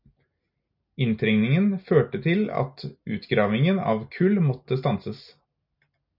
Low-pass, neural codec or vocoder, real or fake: 5.4 kHz; none; real